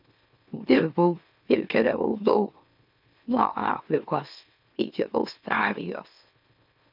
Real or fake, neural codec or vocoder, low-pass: fake; autoencoder, 44.1 kHz, a latent of 192 numbers a frame, MeloTTS; 5.4 kHz